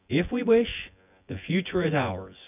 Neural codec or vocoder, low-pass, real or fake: vocoder, 24 kHz, 100 mel bands, Vocos; 3.6 kHz; fake